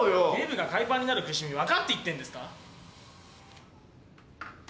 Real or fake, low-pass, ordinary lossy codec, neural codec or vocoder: real; none; none; none